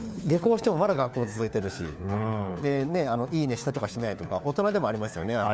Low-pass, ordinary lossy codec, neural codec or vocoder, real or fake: none; none; codec, 16 kHz, 4 kbps, FunCodec, trained on LibriTTS, 50 frames a second; fake